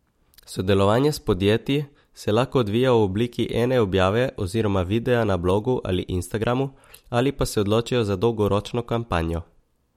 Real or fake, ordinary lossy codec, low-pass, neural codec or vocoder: real; MP3, 64 kbps; 19.8 kHz; none